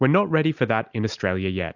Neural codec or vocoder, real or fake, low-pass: none; real; 7.2 kHz